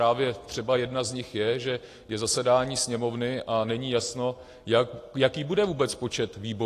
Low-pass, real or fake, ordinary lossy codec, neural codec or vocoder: 14.4 kHz; real; AAC, 48 kbps; none